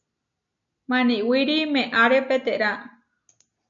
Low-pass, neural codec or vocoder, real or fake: 7.2 kHz; none; real